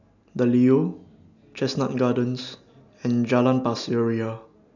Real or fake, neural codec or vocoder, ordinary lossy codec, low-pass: real; none; none; 7.2 kHz